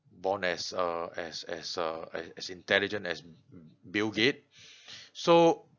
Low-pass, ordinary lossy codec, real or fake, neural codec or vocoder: 7.2 kHz; none; real; none